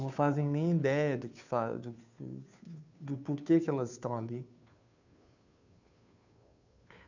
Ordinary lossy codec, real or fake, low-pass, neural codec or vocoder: none; fake; 7.2 kHz; codec, 16 kHz, 2 kbps, FunCodec, trained on Chinese and English, 25 frames a second